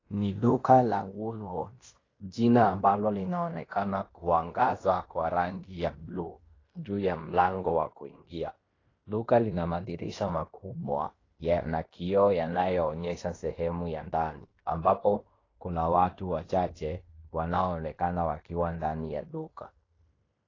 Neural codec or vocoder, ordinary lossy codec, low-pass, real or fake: codec, 16 kHz in and 24 kHz out, 0.9 kbps, LongCat-Audio-Codec, fine tuned four codebook decoder; AAC, 32 kbps; 7.2 kHz; fake